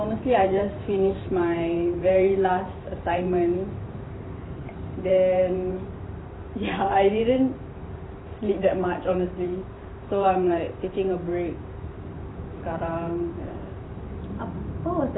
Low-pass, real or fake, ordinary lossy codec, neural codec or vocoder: 7.2 kHz; fake; AAC, 16 kbps; vocoder, 44.1 kHz, 128 mel bands every 512 samples, BigVGAN v2